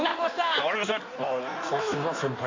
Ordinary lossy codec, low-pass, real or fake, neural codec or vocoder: MP3, 64 kbps; 7.2 kHz; fake; codec, 16 kHz in and 24 kHz out, 1.1 kbps, FireRedTTS-2 codec